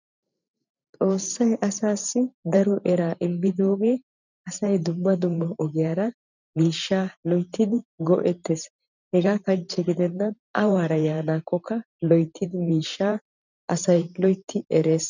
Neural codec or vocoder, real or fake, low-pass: vocoder, 44.1 kHz, 128 mel bands every 512 samples, BigVGAN v2; fake; 7.2 kHz